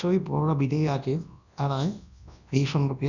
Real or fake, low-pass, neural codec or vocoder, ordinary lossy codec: fake; 7.2 kHz; codec, 24 kHz, 0.9 kbps, WavTokenizer, large speech release; none